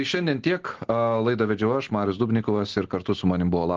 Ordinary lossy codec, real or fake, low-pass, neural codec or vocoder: Opus, 16 kbps; real; 7.2 kHz; none